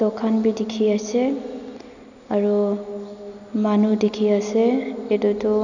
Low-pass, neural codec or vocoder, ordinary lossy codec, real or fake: 7.2 kHz; none; none; real